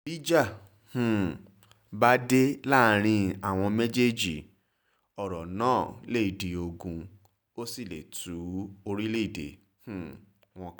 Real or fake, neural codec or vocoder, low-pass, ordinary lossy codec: real; none; none; none